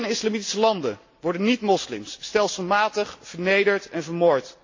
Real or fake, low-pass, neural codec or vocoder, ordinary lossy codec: real; 7.2 kHz; none; AAC, 48 kbps